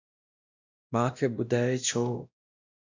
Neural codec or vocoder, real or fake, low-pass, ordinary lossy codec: codec, 16 kHz, 1 kbps, X-Codec, WavLM features, trained on Multilingual LibriSpeech; fake; 7.2 kHz; AAC, 48 kbps